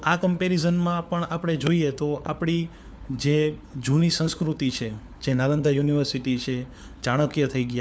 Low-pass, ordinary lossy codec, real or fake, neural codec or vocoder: none; none; fake; codec, 16 kHz, 4 kbps, FunCodec, trained on LibriTTS, 50 frames a second